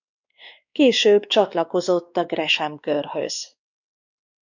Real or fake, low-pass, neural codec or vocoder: fake; 7.2 kHz; codec, 16 kHz, 2 kbps, X-Codec, WavLM features, trained on Multilingual LibriSpeech